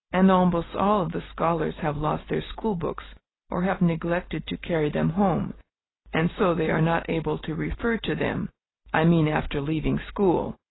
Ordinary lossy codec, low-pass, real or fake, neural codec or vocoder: AAC, 16 kbps; 7.2 kHz; real; none